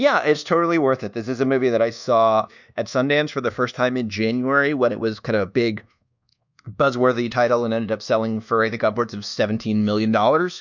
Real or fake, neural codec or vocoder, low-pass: fake; codec, 24 kHz, 1.2 kbps, DualCodec; 7.2 kHz